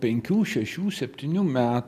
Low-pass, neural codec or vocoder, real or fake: 14.4 kHz; none; real